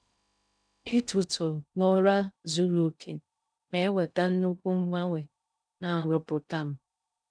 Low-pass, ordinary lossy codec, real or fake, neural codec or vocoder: 9.9 kHz; none; fake; codec, 16 kHz in and 24 kHz out, 0.6 kbps, FocalCodec, streaming, 2048 codes